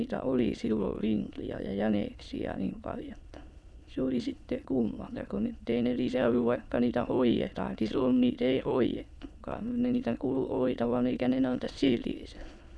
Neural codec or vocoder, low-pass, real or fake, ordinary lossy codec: autoencoder, 22.05 kHz, a latent of 192 numbers a frame, VITS, trained on many speakers; none; fake; none